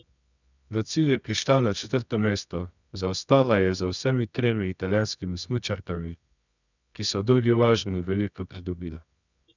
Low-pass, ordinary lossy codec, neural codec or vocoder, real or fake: 7.2 kHz; none; codec, 24 kHz, 0.9 kbps, WavTokenizer, medium music audio release; fake